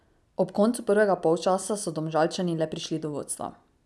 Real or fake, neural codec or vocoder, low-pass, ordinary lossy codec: real; none; none; none